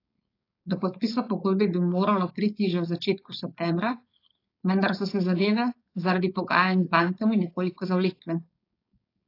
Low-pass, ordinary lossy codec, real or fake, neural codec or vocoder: 5.4 kHz; AAC, 32 kbps; fake; codec, 16 kHz, 4.8 kbps, FACodec